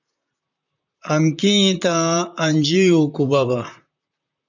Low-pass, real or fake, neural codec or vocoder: 7.2 kHz; fake; vocoder, 44.1 kHz, 128 mel bands, Pupu-Vocoder